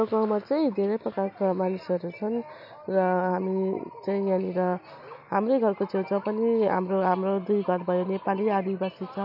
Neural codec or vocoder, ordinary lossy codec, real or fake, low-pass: none; none; real; 5.4 kHz